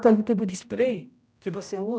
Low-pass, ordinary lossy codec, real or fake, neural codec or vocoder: none; none; fake; codec, 16 kHz, 0.5 kbps, X-Codec, HuBERT features, trained on general audio